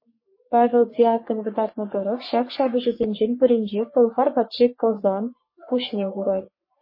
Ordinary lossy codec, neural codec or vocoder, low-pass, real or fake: MP3, 24 kbps; codec, 44.1 kHz, 3.4 kbps, Pupu-Codec; 5.4 kHz; fake